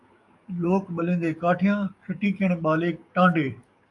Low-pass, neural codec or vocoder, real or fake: 10.8 kHz; codec, 44.1 kHz, 7.8 kbps, DAC; fake